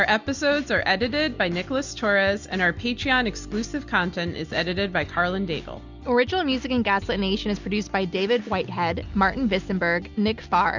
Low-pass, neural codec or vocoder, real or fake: 7.2 kHz; none; real